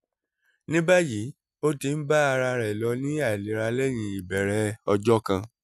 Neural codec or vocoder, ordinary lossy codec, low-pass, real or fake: none; none; 14.4 kHz; real